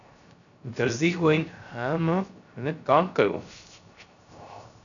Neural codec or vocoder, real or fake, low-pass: codec, 16 kHz, 0.3 kbps, FocalCodec; fake; 7.2 kHz